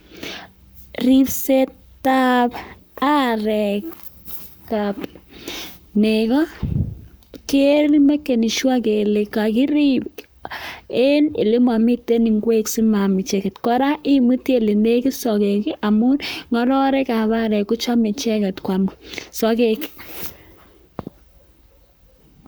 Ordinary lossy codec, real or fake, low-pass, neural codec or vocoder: none; fake; none; codec, 44.1 kHz, 7.8 kbps, Pupu-Codec